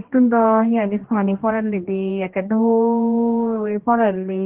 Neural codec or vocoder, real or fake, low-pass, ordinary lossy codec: codec, 16 kHz, 1 kbps, X-Codec, HuBERT features, trained on general audio; fake; 3.6 kHz; Opus, 16 kbps